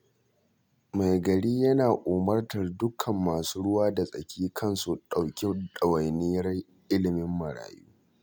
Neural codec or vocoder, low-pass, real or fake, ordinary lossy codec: none; none; real; none